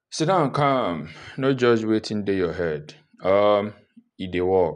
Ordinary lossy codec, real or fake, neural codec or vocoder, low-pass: none; real; none; 10.8 kHz